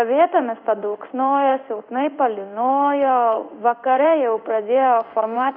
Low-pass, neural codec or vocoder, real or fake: 5.4 kHz; codec, 16 kHz in and 24 kHz out, 1 kbps, XY-Tokenizer; fake